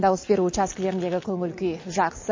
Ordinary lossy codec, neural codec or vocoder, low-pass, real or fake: MP3, 32 kbps; none; 7.2 kHz; real